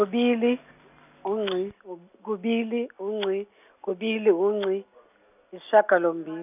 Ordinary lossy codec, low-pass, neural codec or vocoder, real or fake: none; 3.6 kHz; none; real